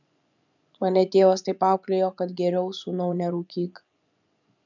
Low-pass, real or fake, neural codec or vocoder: 7.2 kHz; fake; vocoder, 24 kHz, 100 mel bands, Vocos